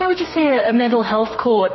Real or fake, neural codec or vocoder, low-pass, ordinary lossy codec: fake; codec, 32 kHz, 1.9 kbps, SNAC; 7.2 kHz; MP3, 24 kbps